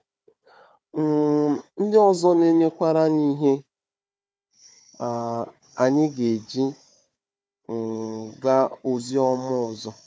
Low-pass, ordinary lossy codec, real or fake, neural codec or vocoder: none; none; fake; codec, 16 kHz, 4 kbps, FunCodec, trained on Chinese and English, 50 frames a second